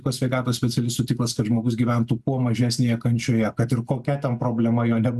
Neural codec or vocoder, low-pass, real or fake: none; 14.4 kHz; real